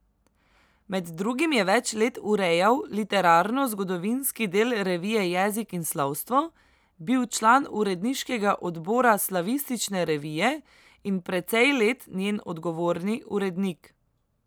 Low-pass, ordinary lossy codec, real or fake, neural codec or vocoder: none; none; real; none